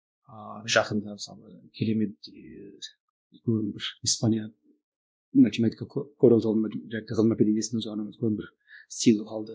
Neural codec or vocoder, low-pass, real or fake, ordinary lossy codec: codec, 16 kHz, 1 kbps, X-Codec, WavLM features, trained on Multilingual LibriSpeech; none; fake; none